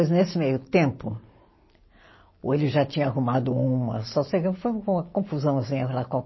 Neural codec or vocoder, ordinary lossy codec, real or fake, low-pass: none; MP3, 24 kbps; real; 7.2 kHz